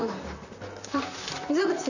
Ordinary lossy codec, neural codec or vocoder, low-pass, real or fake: none; vocoder, 44.1 kHz, 128 mel bands, Pupu-Vocoder; 7.2 kHz; fake